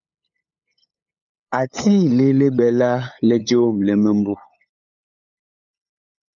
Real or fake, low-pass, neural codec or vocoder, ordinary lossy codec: fake; 7.2 kHz; codec, 16 kHz, 8 kbps, FunCodec, trained on LibriTTS, 25 frames a second; MP3, 96 kbps